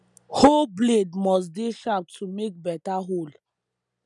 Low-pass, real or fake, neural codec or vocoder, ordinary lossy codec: 10.8 kHz; real; none; none